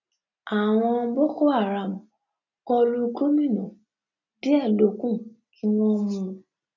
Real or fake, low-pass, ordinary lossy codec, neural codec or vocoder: real; 7.2 kHz; none; none